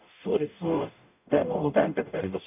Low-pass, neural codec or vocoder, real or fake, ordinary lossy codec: 3.6 kHz; codec, 44.1 kHz, 0.9 kbps, DAC; fake; none